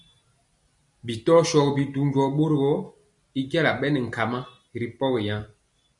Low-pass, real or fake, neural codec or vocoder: 10.8 kHz; real; none